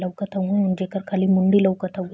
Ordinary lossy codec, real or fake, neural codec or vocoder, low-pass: none; real; none; none